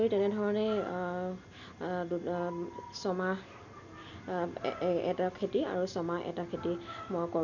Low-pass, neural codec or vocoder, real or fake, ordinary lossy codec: 7.2 kHz; none; real; none